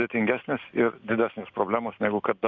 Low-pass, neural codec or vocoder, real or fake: 7.2 kHz; none; real